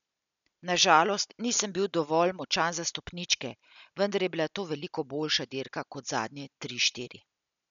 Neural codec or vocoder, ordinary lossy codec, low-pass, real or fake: none; none; 7.2 kHz; real